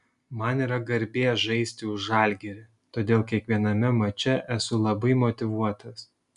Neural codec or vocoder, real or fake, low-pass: none; real; 10.8 kHz